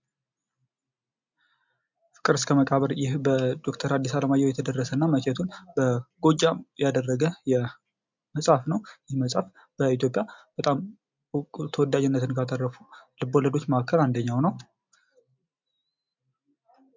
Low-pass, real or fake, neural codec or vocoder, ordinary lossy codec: 7.2 kHz; real; none; MP3, 64 kbps